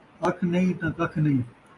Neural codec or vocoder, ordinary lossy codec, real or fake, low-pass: none; AAC, 48 kbps; real; 10.8 kHz